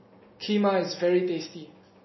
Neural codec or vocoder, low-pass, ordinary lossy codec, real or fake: none; 7.2 kHz; MP3, 24 kbps; real